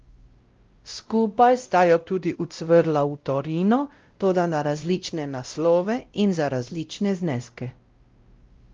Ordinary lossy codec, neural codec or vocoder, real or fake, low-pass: Opus, 32 kbps; codec, 16 kHz, 0.5 kbps, X-Codec, WavLM features, trained on Multilingual LibriSpeech; fake; 7.2 kHz